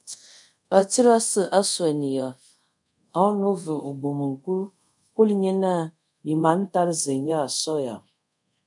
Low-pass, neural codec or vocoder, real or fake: 10.8 kHz; codec, 24 kHz, 0.5 kbps, DualCodec; fake